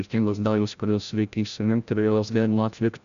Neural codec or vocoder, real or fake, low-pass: codec, 16 kHz, 0.5 kbps, FreqCodec, larger model; fake; 7.2 kHz